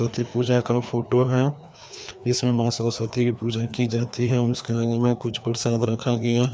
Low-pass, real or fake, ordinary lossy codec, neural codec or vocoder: none; fake; none; codec, 16 kHz, 2 kbps, FreqCodec, larger model